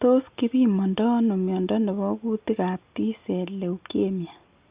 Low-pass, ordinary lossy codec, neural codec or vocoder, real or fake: 3.6 kHz; Opus, 64 kbps; none; real